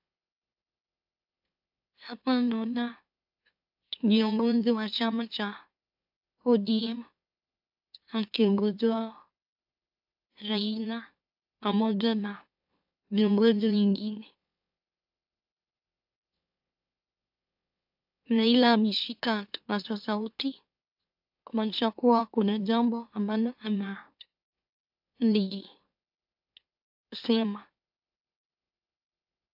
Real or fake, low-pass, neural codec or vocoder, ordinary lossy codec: fake; 5.4 kHz; autoencoder, 44.1 kHz, a latent of 192 numbers a frame, MeloTTS; AAC, 48 kbps